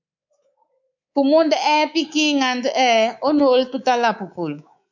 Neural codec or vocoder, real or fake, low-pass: codec, 24 kHz, 3.1 kbps, DualCodec; fake; 7.2 kHz